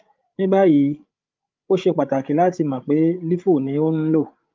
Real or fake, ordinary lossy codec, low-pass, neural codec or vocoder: fake; Opus, 24 kbps; 7.2 kHz; codec, 16 kHz, 8 kbps, FreqCodec, larger model